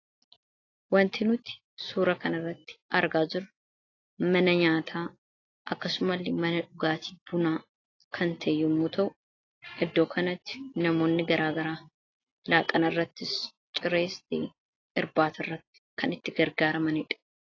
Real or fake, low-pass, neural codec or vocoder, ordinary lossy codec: real; 7.2 kHz; none; AAC, 32 kbps